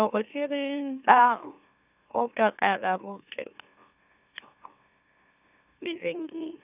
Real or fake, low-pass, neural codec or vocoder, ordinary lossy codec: fake; 3.6 kHz; autoencoder, 44.1 kHz, a latent of 192 numbers a frame, MeloTTS; none